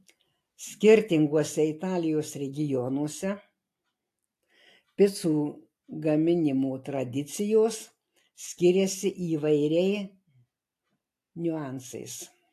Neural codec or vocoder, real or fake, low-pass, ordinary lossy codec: none; real; 14.4 kHz; AAC, 64 kbps